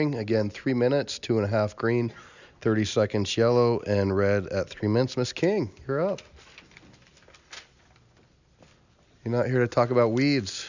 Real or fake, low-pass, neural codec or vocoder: real; 7.2 kHz; none